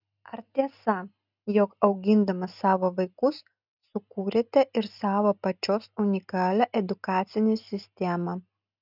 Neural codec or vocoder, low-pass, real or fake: none; 5.4 kHz; real